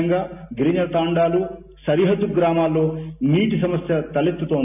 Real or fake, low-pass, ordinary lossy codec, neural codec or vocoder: real; 3.6 kHz; none; none